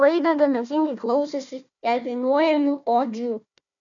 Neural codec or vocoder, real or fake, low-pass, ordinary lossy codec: codec, 16 kHz, 1 kbps, FunCodec, trained on Chinese and English, 50 frames a second; fake; 7.2 kHz; MP3, 96 kbps